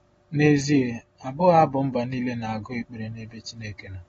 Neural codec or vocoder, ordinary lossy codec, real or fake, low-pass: none; AAC, 24 kbps; real; 19.8 kHz